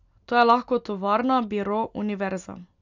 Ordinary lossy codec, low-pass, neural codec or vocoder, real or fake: none; 7.2 kHz; none; real